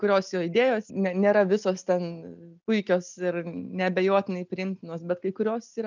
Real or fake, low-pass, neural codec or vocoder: real; 7.2 kHz; none